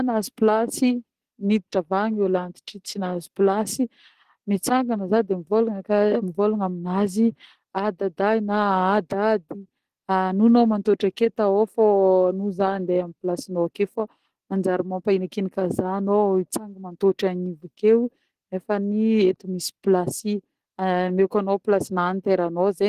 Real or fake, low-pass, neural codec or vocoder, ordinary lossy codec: real; 14.4 kHz; none; Opus, 16 kbps